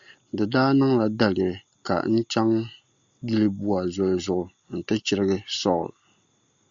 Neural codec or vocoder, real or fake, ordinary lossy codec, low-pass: none; real; Opus, 64 kbps; 7.2 kHz